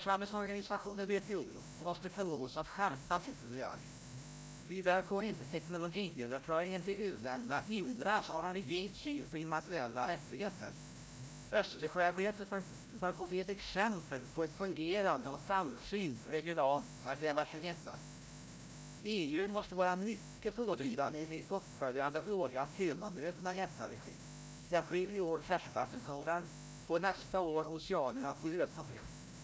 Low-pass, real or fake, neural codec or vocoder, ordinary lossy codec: none; fake; codec, 16 kHz, 0.5 kbps, FreqCodec, larger model; none